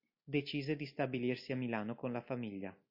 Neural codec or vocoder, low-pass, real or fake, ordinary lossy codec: none; 5.4 kHz; real; MP3, 32 kbps